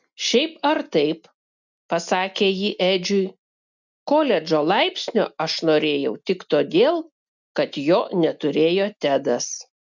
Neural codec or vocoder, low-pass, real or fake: none; 7.2 kHz; real